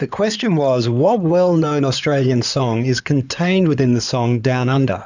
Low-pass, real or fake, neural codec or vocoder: 7.2 kHz; fake; codec, 16 kHz in and 24 kHz out, 2.2 kbps, FireRedTTS-2 codec